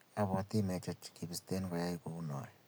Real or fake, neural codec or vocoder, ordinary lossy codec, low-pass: real; none; none; none